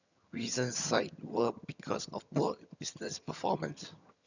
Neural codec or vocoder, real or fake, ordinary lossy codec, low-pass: vocoder, 22.05 kHz, 80 mel bands, HiFi-GAN; fake; none; 7.2 kHz